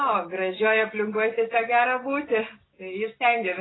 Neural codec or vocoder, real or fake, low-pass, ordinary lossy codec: none; real; 7.2 kHz; AAC, 16 kbps